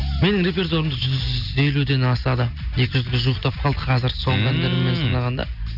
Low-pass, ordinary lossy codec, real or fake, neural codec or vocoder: 5.4 kHz; none; real; none